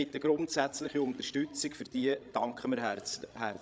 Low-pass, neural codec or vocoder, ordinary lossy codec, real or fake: none; codec, 16 kHz, 16 kbps, FreqCodec, larger model; none; fake